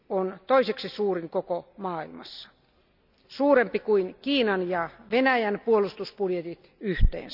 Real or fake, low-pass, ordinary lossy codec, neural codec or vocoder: real; 5.4 kHz; none; none